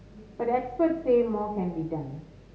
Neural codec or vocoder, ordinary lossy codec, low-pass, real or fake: none; none; none; real